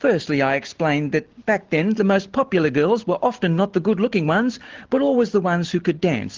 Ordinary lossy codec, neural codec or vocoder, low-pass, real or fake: Opus, 16 kbps; none; 7.2 kHz; real